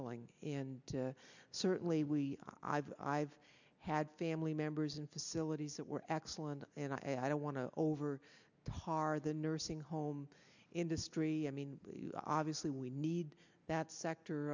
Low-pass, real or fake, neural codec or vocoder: 7.2 kHz; real; none